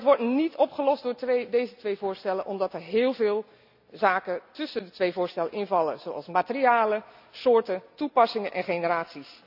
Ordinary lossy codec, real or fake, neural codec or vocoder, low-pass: none; real; none; 5.4 kHz